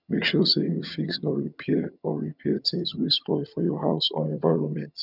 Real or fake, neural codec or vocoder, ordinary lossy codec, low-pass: fake; vocoder, 22.05 kHz, 80 mel bands, HiFi-GAN; none; 5.4 kHz